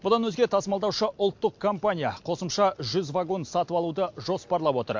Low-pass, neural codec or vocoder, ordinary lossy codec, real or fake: 7.2 kHz; none; MP3, 48 kbps; real